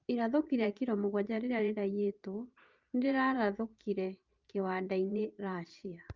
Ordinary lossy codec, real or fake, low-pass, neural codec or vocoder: Opus, 24 kbps; fake; 7.2 kHz; vocoder, 44.1 kHz, 128 mel bands every 512 samples, BigVGAN v2